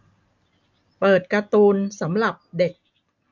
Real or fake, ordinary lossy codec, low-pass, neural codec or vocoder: real; MP3, 64 kbps; 7.2 kHz; none